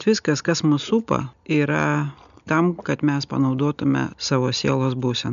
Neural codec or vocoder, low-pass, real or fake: none; 7.2 kHz; real